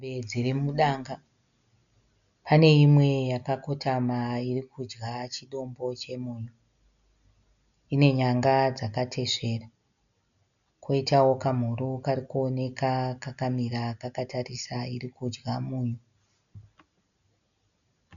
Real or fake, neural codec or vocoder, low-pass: real; none; 7.2 kHz